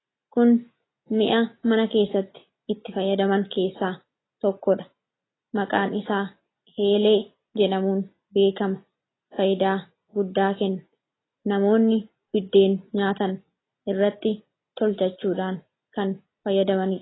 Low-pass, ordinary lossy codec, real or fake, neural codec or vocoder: 7.2 kHz; AAC, 16 kbps; real; none